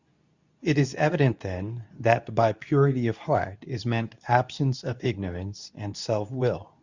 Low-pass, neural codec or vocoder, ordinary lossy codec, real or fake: 7.2 kHz; codec, 24 kHz, 0.9 kbps, WavTokenizer, medium speech release version 2; Opus, 64 kbps; fake